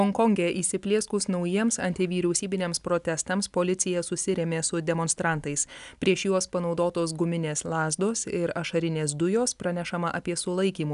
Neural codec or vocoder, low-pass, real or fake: none; 10.8 kHz; real